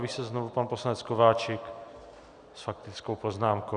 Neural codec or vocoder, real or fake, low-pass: none; real; 9.9 kHz